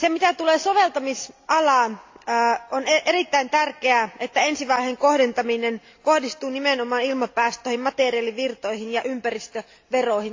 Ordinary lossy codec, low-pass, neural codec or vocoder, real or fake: AAC, 48 kbps; 7.2 kHz; none; real